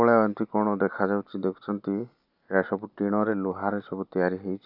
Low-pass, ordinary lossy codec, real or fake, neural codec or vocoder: 5.4 kHz; none; real; none